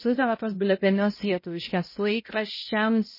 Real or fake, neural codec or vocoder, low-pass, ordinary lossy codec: fake; codec, 16 kHz, 0.5 kbps, X-Codec, HuBERT features, trained on balanced general audio; 5.4 kHz; MP3, 24 kbps